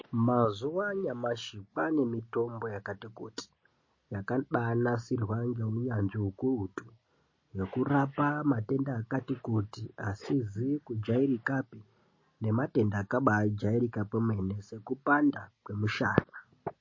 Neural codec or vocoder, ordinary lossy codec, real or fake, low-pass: none; MP3, 32 kbps; real; 7.2 kHz